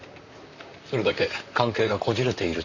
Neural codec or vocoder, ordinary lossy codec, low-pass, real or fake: vocoder, 44.1 kHz, 128 mel bands, Pupu-Vocoder; none; 7.2 kHz; fake